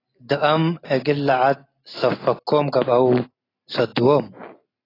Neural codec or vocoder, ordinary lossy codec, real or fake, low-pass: none; AAC, 24 kbps; real; 5.4 kHz